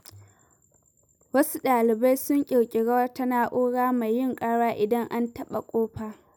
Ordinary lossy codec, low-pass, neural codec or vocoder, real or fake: none; none; none; real